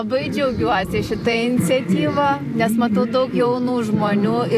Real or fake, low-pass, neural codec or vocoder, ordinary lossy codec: fake; 14.4 kHz; vocoder, 44.1 kHz, 128 mel bands every 512 samples, BigVGAN v2; AAC, 64 kbps